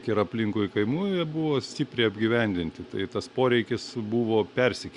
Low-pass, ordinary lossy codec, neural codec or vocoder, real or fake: 10.8 kHz; Opus, 64 kbps; none; real